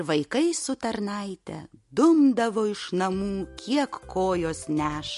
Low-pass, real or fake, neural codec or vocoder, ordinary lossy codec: 14.4 kHz; real; none; MP3, 48 kbps